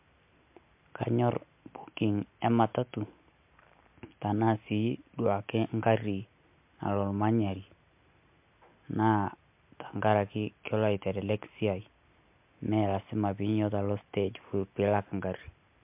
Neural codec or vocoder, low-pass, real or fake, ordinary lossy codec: none; 3.6 kHz; real; MP3, 32 kbps